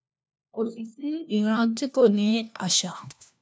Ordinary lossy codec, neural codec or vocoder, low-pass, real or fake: none; codec, 16 kHz, 1 kbps, FunCodec, trained on LibriTTS, 50 frames a second; none; fake